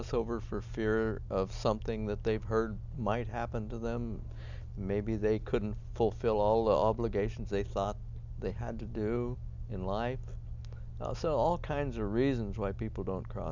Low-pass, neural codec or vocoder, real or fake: 7.2 kHz; none; real